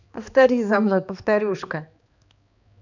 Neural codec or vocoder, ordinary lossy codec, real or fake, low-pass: codec, 16 kHz, 2 kbps, X-Codec, HuBERT features, trained on balanced general audio; none; fake; 7.2 kHz